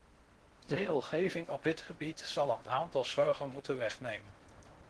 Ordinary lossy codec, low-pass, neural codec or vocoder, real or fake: Opus, 16 kbps; 10.8 kHz; codec, 16 kHz in and 24 kHz out, 0.6 kbps, FocalCodec, streaming, 4096 codes; fake